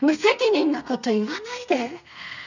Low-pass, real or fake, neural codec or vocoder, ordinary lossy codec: 7.2 kHz; fake; codec, 32 kHz, 1.9 kbps, SNAC; none